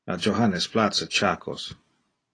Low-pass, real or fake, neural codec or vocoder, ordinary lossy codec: 9.9 kHz; real; none; AAC, 32 kbps